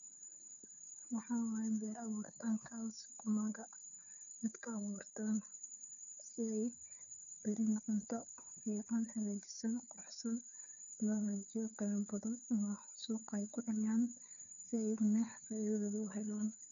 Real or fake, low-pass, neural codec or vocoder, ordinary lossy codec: fake; 7.2 kHz; codec, 16 kHz, 2 kbps, FunCodec, trained on Chinese and English, 25 frames a second; none